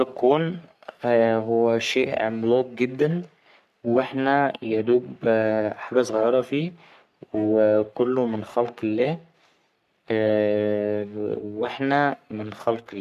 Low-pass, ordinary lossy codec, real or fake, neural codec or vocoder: 14.4 kHz; MP3, 96 kbps; fake; codec, 44.1 kHz, 3.4 kbps, Pupu-Codec